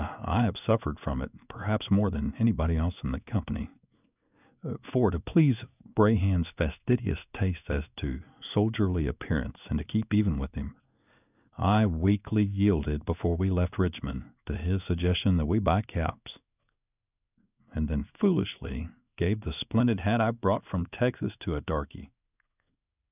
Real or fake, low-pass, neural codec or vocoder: fake; 3.6 kHz; codec, 16 kHz in and 24 kHz out, 1 kbps, XY-Tokenizer